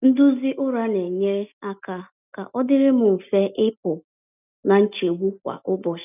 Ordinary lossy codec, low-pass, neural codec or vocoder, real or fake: none; 3.6 kHz; none; real